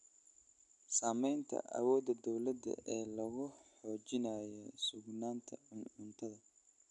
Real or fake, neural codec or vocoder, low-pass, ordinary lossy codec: real; none; 10.8 kHz; none